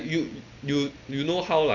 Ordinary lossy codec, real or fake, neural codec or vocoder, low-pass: none; real; none; 7.2 kHz